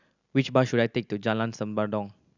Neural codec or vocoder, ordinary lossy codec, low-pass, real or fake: none; none; 7.2 kHz; real